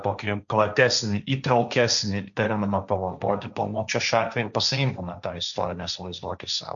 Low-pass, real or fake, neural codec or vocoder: 7.2 kHz; fake; codec, 16 kHz, 1.1 kbps, Voila-Tokenizer